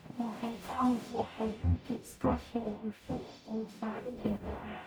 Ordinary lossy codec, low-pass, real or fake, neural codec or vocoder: none; none; fake; codec, 44.1 kHz, 0.9 kbps, DAC